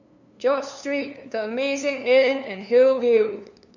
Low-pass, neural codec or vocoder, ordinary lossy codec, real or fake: 7.2 kHz; codec, 16 kHz, 2 kbps, FunCodec, trained on LibriTTS, 25 frames a second; none; fake